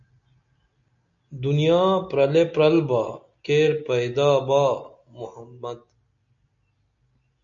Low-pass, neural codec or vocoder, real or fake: 7.2 kHz; none; real